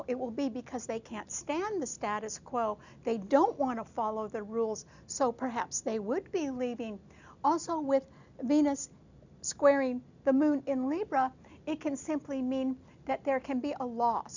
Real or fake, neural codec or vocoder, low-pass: real; none; 7.2 kHz